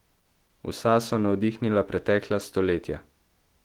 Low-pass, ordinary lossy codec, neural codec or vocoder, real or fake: 19.8 kHz; Opus, 16 kbps; autoencoder, 48 kHz, 128 numbers a frame, DAC-VAE, trained on Japanese speech; fake